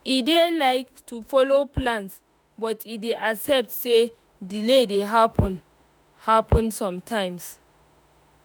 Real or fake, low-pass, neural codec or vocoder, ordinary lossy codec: fake; none; autoencoder, 48 kHz, 32 numbers a frame, DAC-VAE, trained on Japanese speech; none